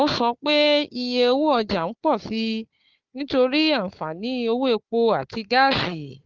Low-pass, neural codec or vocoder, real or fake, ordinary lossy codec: 7.2 kHz; codec, 16 kHz, 4 kbps, FunCodec, trained on Chinese and English, 50 frames a second; fake; Opus, 24 kbps